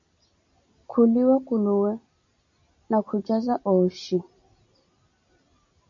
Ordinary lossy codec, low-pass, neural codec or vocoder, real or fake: MP3, 64 kbps; 7.2 kHz; none; real